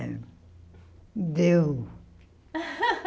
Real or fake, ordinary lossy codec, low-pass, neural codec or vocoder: real; none; none; none